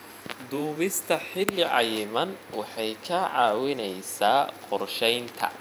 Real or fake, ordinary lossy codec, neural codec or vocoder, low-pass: fake; none; vocoder, 44.1 kHz, 128 mel bands every 512 samples, BigVGAN v2; none